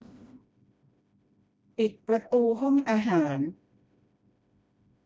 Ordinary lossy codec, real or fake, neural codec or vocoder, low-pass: none; fake; codec, 16 kHz, 1 kbps, FreqCodec, smaller model; none